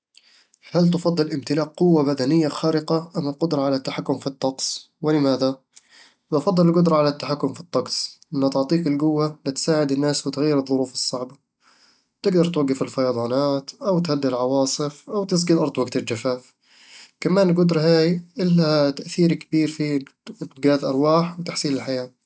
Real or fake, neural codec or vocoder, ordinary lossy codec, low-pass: real; none; none; none